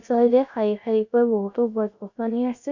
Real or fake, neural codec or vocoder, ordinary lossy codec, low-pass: fake; codec, 16 kHz, 0.3 kbps, FocalCodec; none; 7.2 kHz